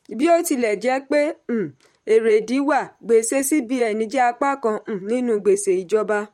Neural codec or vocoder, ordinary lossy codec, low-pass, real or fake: vocoder, 44.1 kHz, 128 mel bands, Pupu-Vocoder; MP3, 64 kbps; 19.8 kHz; fake